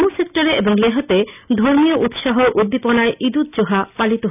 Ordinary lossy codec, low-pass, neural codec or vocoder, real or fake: none; 3.6 kHz; none; real